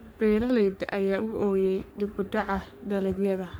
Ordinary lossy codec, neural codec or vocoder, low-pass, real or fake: none; codec, 44.1 kHz, 3.4 kbps, Pupu-Codec; none; fake